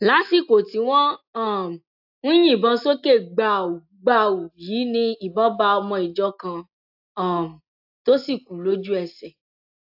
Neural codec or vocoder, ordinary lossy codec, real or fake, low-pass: none; AAC, 48 kbps; real; 5.4 kHz